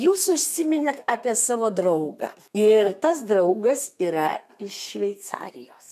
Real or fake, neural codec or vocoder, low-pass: fake; codec, 32 kHz, 1.9 kbps, SNAC; 14.4 kHz